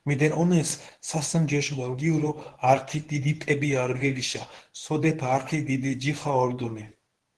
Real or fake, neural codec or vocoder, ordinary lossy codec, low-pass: fake; codec, 24 kHz, 0.9 kbps, WavTokenizer, medium speech release version 1; Opus, 16 kbps; 10.8 kHz